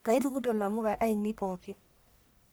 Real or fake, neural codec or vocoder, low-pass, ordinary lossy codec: fake; codec, 44.1 kHz, 1.7 kbps, Pupu-Codec; none; none